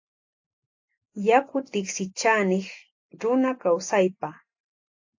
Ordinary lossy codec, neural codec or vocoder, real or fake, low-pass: AAC, 48 kbps; none; real; 7.2 kHz